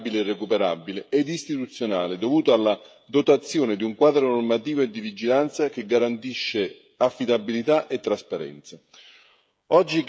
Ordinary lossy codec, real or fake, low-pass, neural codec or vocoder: none; fake; none; codec, 16 kHz, 16 kbps, FreqCodec, smaller model